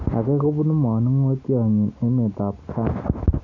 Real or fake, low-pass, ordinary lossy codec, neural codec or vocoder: real; 7.2 kHz; none; none